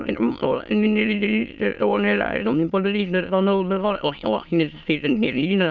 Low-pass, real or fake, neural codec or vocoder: 7.2 kHz; fake; autoencoder, 22.05 kHz, a latent of 192 numbers a frame, VITS, trained on many speakers